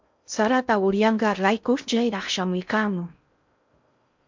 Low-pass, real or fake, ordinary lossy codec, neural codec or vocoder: 7.2 kHz; fake; MP3, 64 kbps; codec, 16 kHz in and 24 kHz out, 0.6 kbps, FocalCodec, streaming, 2048 codes